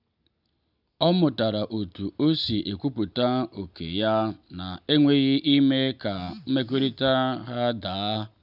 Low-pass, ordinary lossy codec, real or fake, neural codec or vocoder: 5.4 kHz; none; real; none